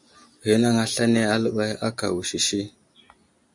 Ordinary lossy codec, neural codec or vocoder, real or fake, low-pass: MP3, 64 kbps; none; real; 10.8 kHz